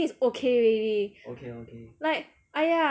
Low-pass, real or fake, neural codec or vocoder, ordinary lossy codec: none; real; none; none